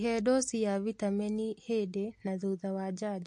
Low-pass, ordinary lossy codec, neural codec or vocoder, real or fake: 10.8 kHz; MP3, 48 kbps; none; real